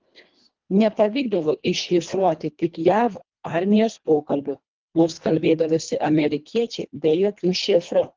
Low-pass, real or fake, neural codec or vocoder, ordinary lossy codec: 7.2 kHz; fake; codec, 24 kHz, 1.5 kbps, HILCodec; Opus, 32 kbps